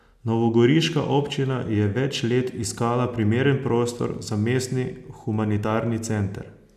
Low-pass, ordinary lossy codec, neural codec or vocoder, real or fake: 14.4 kHz; none; none; real